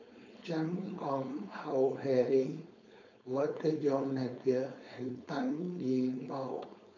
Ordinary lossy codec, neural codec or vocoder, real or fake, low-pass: none; codec, 16 kHz, 4.8 kbps, FACodec; fake; 7.2 kHz